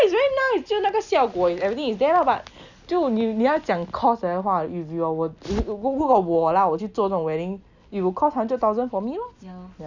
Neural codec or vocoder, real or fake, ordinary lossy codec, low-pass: none; real; none; 7.2 kHz